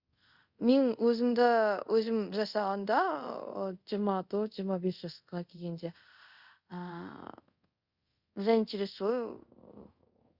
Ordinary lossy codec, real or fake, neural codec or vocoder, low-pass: Opus, 64 kbps; fake; codec, 24 kHz, 0.5 kbps, DualCodec; 5.4 kHz